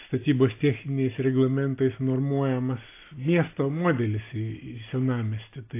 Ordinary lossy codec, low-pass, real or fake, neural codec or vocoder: AAC, 24 kbps; 3.6 kHz; real; none